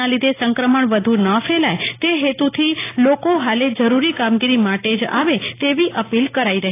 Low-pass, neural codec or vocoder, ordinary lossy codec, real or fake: 3.6 kHz; none; AAC, 24 kbps; real